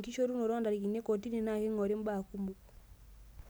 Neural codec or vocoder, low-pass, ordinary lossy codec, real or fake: none; none; none; real